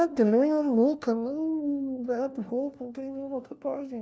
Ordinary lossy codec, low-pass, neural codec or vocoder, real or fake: none; none; codec, 16 kHz, 1 kbps, FunCodec, trained on LibriTTS, 50 frames a second; fake